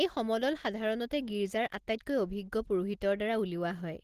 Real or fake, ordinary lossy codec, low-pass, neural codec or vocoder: real; Opus, 16 kbps; 14.4 kHz; none